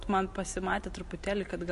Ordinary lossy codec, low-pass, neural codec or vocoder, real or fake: MP3, 48 kbps; 14.4 kHz; vocoder, 44.1 kHz, 128 mel bands every 512 samples, BigVGAN v2; fake